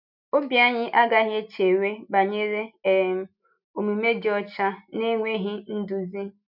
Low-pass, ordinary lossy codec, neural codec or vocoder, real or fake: 5.4 kHz; none; none; real